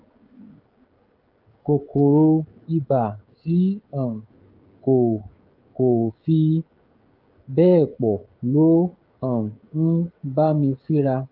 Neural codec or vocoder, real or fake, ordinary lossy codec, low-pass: codec, 16 kHz, 16 kbps, FreqCodec, smaller model; fake; Opus, 24 kbps; 5.4 kHz